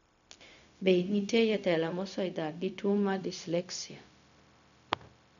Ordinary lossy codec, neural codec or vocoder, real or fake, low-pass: none; codec, 16 kHz, 0.4 kbps, LongCat-Audio-Codec; fake; 7.2 kHz